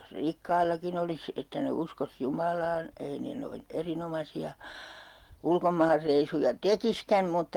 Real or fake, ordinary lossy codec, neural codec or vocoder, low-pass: real; Opus, 24 kbps; none; 19.8 kHz